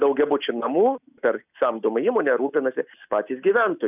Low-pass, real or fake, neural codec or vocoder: 3.6 kHz; real; none